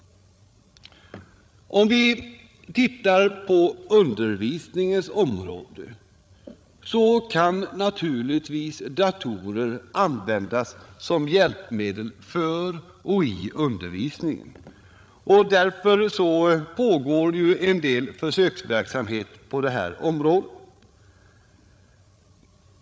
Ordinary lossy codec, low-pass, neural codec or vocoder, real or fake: none; none; codec, 16 kHz, 16 kbps, FreqCodec, larger model; fake